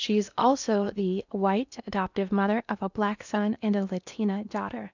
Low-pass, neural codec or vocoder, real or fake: 7.2 kHz; codec, 16 kHz in and 24 kHz out, 0.8 kbps, FocalCodec, streaming, 65536 codes; fake